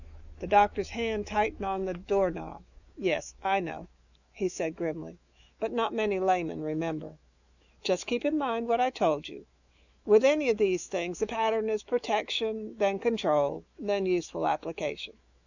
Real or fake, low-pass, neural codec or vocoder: fake; 7.2 kHz; autoencoder, 48 kHz, 128 numbers a frame, DAC-VAE, trained on Japanese speech